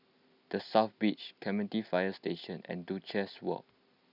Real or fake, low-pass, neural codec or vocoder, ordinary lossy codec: real; 5.4 kHz; none; none